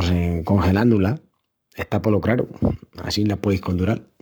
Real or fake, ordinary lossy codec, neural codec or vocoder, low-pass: fake; none; codec, 44.1 kHz, 7.8 kbps, Pupu-Codec; none